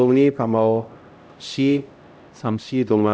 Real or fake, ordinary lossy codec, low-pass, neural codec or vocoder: fake; none; none; codec, 16 kHz, 0.5 kbps, X-Codec, HuBERT features, trained on LibriSpeech